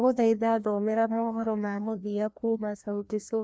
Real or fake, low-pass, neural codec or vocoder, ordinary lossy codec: fake; none; codec, 16 kHz, 1 kbps, FreqCodec, larger model; none